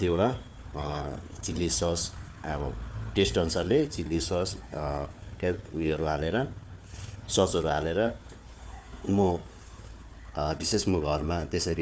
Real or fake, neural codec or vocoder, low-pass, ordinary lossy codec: fake; codec, 16 kHz, 4 kbps, FunCodec, trained on Chinese and English, 50 frames a second; none; none